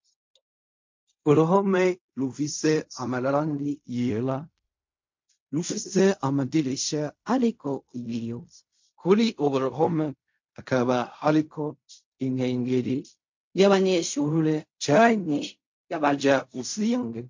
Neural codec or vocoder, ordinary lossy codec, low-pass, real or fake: codec, 16 kHz in and 24 kHz out, 0.4 kbps, LongCat-Audio-Codec, fine tuned four codebook decoder; MP3, 48 kbps; 7.2 kHz; fake